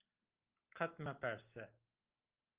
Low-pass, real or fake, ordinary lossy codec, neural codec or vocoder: 3.6 kHz; real; Opus, 24 kbps; none